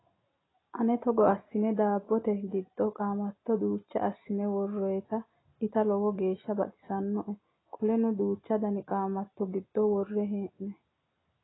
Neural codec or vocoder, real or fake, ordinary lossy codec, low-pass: none; real; AAC, 16 kbps; 7.2 kHz